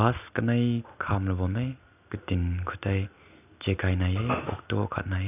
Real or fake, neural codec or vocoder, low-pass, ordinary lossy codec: real; none; 3.6 kHz; none